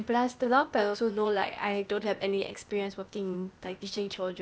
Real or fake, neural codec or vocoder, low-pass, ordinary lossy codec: fake; codec, 16 kHz, 0.8 kbps, ZipCodec; none; none